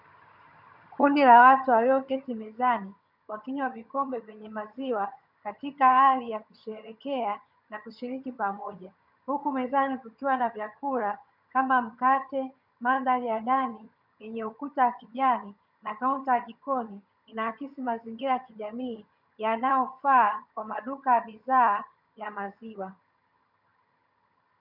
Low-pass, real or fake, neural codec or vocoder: 5.4 kHz; fake; vocoder, 22.05 kHz, 80 mel bands, HiFi-GAN